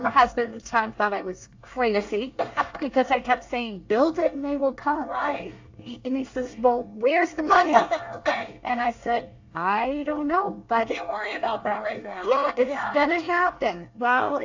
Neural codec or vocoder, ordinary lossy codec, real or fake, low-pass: codec, 24 kHz, 1 kbps, SNAC; AAC, 48 kbps; fake; 7.2 kHz